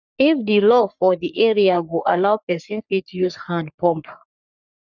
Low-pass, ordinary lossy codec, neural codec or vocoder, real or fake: 7.2 kHz; none; codec, 44.1 kHz, 3.4 kbps, Pupu-Codec; fake